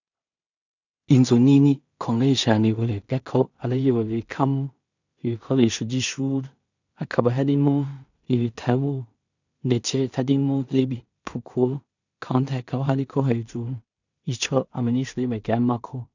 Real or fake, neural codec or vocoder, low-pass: fake; codec, 16 kHz in and 24 kHz out, 0.4 kbps, LongCat-Audio-Codec, two codebook decoder; 7.2 kHz